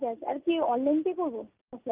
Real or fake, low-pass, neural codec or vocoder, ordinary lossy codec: real; 3.6 kHz; none; Opus, 24 kbps